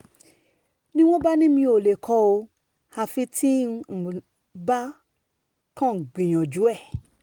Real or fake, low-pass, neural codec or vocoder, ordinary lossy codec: real; 19.8 kHz; none; Opus, 24 kbps